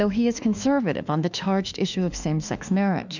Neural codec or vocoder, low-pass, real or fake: autoencoder, 48 kHz, 32 numbers a frame, DAC-VAE, trained on Japanese speech; 7.2 kHz; fake